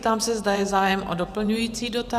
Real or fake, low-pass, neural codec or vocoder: fake; 14.4 kHz; vocoder, 44.1 kHz, 128 mel bands every 512 samples, BigVGAN v2